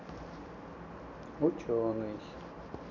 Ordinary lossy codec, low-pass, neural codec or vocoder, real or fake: none; 7.2 kHz; none; real